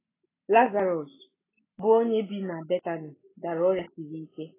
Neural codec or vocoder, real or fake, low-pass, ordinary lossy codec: none; real; 3.6 kHz; AAC, 16 kbps